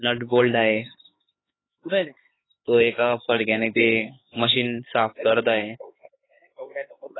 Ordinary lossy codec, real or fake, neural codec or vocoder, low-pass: AAC, 16 kbps; fake; codec, 16 kHz, 4 kbps, X-Codec, HuBERT features, trained on LibriSpeech; 7.2 kHz